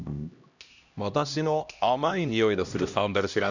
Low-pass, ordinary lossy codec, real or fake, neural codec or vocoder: 7.2 kHz; none; fake; codec, 16 kHz, 1 kbps, X-Codec, HuBERT features, trained on LibriSpeech